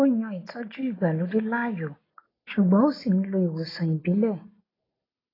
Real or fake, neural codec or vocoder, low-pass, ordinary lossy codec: real; none; 5.4 kHz; AAC, 24 kbps